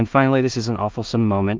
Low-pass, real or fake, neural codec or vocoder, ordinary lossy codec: 7.2 kHz; fake; codec, 16 kHz in and 24 kHz out, 0.4 kbps, LongCat-Audio-Codec, two codebook decoder; Opus, 24 kbps